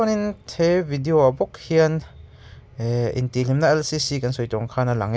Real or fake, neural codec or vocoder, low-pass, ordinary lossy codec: real; none; none; none